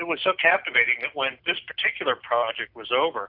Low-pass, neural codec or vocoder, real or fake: 5.4 kHz; none; real